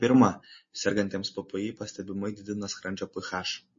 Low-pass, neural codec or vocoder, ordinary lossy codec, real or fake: 7.2 kHz; none; MP3, 32 kbps; real